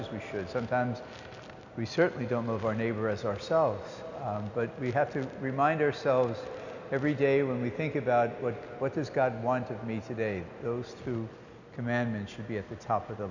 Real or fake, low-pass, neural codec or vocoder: real; 7.2 kHz; none